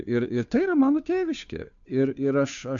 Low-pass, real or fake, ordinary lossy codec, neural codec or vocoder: 7.2 kHz; fake; AAC, 64 kbps; codec, 16 kHz, 2 kbps, FunCodec, trained on Chinese and English, 25 frames a second